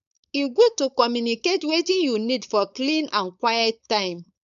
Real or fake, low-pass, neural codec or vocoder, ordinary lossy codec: fake; 7.2 kHz; codec, 16 kHz, 4.8 kbps, FACodec; none